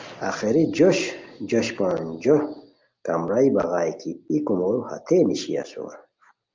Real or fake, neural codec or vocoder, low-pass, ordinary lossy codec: real; none; 7.2 kHz; Opus, 32 kbps